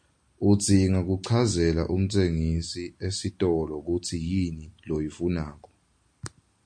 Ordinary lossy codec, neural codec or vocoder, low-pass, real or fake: MP3, 48 kbps; none; 9.9 kHz; real